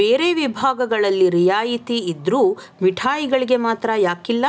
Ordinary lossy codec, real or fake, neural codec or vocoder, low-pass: none; real; none; none